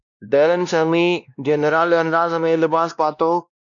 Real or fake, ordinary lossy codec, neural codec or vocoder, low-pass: fake; MP3, 96 kbps; codec, 16 kHz, 1 kbps, X-Codec, WavLM features, trained on Multilingual LibriSpeech; 7.2 kHz